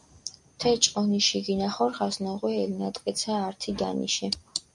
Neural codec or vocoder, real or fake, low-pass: none; real; 10.8 kHz